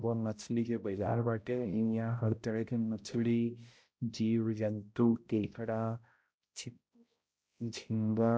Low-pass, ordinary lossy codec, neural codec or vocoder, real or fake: none; none; codec, 16 kHz, 0.5 kbps, X-Codec, HuBERT features, trained on balanced general audio; fake